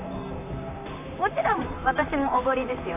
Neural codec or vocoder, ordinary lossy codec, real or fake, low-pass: vocoder, 44.1 kHz, 128 mel bands, Pupu-Vocoder; none; fake; 3.6 kHz